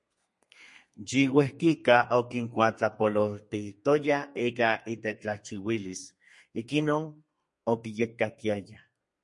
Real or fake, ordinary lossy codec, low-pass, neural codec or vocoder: fake; MP3, 48 kbps; 10.8 kHz; codec, 32 kHz, 1.9 kbps, SNAC